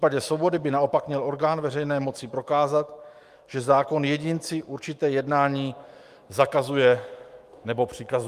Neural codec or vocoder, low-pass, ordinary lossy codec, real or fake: autoencoder, 48 kHz, 128 numbers a frame, DAC-VAE, trained on Japanese speech; 14.4 kHz; Opus, 32 kbps; fake